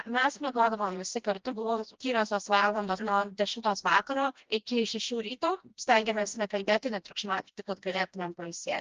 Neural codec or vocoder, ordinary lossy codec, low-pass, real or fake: codec, 16 kHz, 1 kbps, FreqCodec, smaller model; Opus, 24 kbps; 7.2 kHz; fake